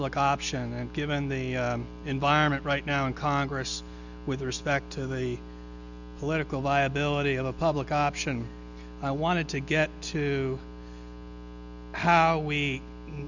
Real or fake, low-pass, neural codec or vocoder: real; 7.2 kHz; none